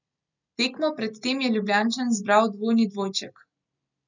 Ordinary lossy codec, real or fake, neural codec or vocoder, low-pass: none; real; none; 7.2 kHz